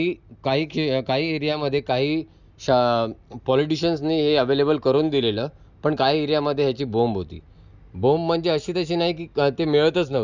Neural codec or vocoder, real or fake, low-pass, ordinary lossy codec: none; real; 7.2 kHz; none